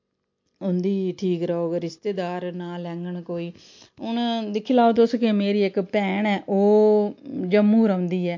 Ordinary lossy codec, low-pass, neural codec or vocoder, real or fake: MP3, 48 kbps; 7.2 kHz; none; real